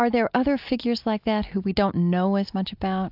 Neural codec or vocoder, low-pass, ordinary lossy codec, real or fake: none; 5.4 kHz; AAC, 48 kbps; real